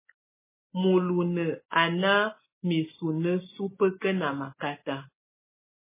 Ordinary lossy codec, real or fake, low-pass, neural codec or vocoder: MP3, 16 kbps; real; 3.6 kHz; none